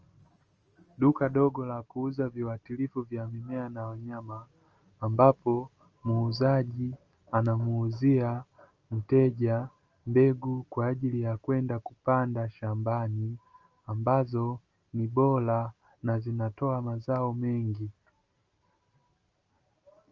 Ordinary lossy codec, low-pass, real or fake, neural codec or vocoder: Opus, 24 kbps; 7.2 kHz; real; none